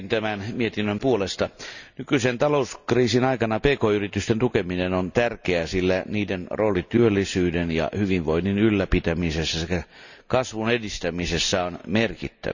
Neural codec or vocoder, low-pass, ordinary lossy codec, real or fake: none; 7.2 kHz; none; real